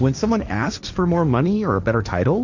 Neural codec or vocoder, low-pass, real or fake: codec, 16 kHz, 1.1 kbps, Voila-Tokenizer; 7.2 kHz; fake